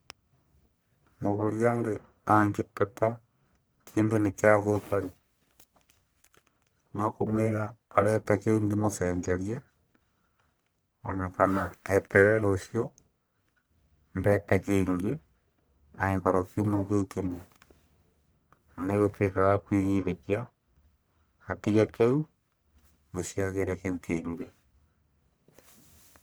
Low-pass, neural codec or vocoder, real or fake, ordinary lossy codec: none; codec, 44.1 kHz, 1.7 kbps, Pupu-Codec; fake; none